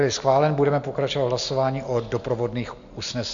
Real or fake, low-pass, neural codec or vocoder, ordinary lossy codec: real; 7.2 kHz; none; MP3, 64 kbps